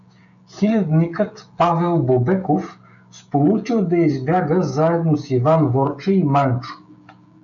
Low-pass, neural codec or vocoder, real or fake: 7.2 kHz; codec, 16 kHz, 16 kbps, FreqCodec, smaller model; fake